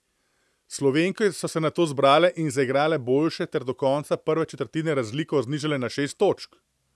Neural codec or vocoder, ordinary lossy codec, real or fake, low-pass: none; none; real; none